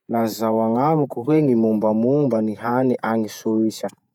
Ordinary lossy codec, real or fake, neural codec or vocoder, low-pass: none; fake; vocoder, 48 kHz, 128 mel bands, Vocos; 19.8 kHz